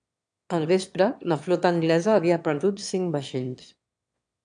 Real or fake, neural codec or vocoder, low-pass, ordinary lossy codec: fake; autoencoder, 22.05 kHz, a latent of 192 numbers a frame, VITS, trained on one speaker; 9.9 kHz; AAC, 64 kbps